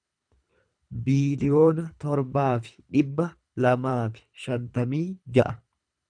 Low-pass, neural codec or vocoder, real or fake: 9.9 kHz; codec, 24 kHz, 3 kbps, HILCodec; fake